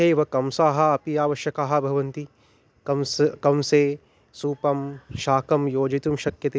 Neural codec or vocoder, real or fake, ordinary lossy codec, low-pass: none; real; none; none